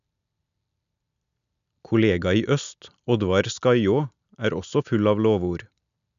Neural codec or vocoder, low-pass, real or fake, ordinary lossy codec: none; 7.2 kHz; real; none